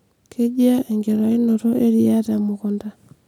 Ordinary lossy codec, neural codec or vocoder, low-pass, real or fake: none; none; 19.8 kHz; real